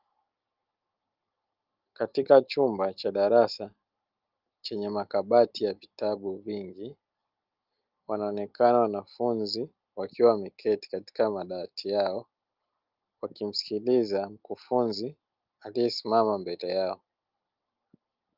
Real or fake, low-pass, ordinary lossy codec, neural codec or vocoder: real; 5.4 kHz; Opus, 32 kbps; none